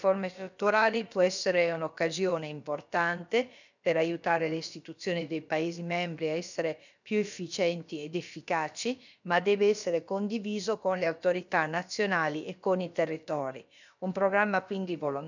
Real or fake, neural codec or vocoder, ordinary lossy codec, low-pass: fake; codec, 16 kHz, about 1 kbps, DyCAST, with the encoder's durations; none; 7.2 kHz